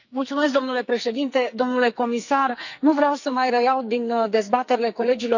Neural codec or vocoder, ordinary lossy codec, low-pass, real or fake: codec, 44.1 kHz, 2.6 kbps, SNAC; none; 7.2 kHz; fake